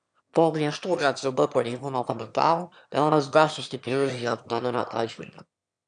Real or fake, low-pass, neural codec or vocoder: fake; 9.9 kHz; autoencoder, 22.05 kHz, a latent of 192 numbers a frame, VITS, trained on one speaker